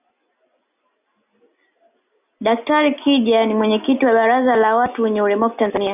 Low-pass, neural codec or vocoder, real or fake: 3.6 kHz; none; real